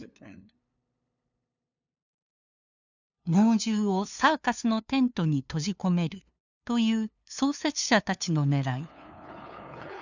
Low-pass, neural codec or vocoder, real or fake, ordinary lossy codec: 7.2 kHz; codec, 16 kHz, 2 kbps, FunCodec, trained on LibriTTS, 25 frames a second; fake; none